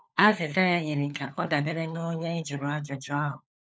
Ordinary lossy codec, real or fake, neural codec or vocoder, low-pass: none; fake; codec, 16 kHz, 4 kbps, FunCodec, trained on LibriTTS, 50 frames a second; none